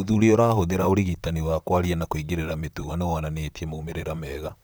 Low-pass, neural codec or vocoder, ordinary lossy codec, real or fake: none; vocoder, 44.1 kHz, 128 mel bands, Pupu-Vocoder; none; fake